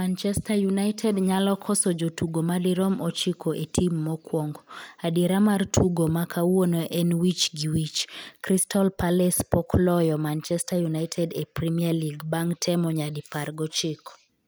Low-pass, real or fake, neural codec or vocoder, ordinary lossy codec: none; real; none; none